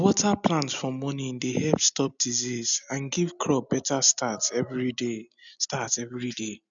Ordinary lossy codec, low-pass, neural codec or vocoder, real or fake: none; 7.2 kHz; none; real